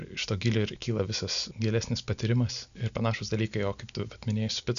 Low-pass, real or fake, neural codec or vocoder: 7.2 kHz; real; none